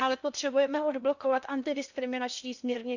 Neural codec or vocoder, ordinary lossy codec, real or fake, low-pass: codec, 16 kHz in and 24 kHz out, 0.8 kbps, FocalCodec, streaming, 65536 codes; none; fake; 7.2 kHz